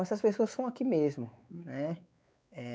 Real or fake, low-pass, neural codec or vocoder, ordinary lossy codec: fake; none; codec, 16 kHz, 4 kbps, X-Codec, WavLM features, trained on Multilingual LibriSpeech; none